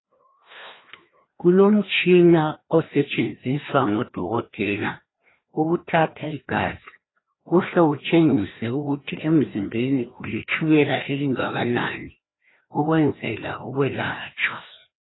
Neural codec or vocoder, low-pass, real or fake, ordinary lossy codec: codec, 16 kHz, 1 kbps, FreqCodec, larger model; 7.2 kHz; fake; AAC, 16 kbps